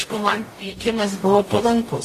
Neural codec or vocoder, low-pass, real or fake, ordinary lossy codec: codec, 44.1 kHz, 0.9 kbps, DAC; 14.4 kHz; fake; AAC, 48 kbps